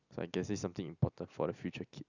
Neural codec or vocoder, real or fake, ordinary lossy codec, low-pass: none; real; none; 7.2 kHz